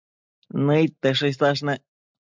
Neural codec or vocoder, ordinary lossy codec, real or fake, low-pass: none; MP3, 64 kbps; real; 7.2 kHz